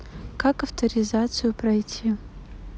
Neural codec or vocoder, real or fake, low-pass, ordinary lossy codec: none; real; none; none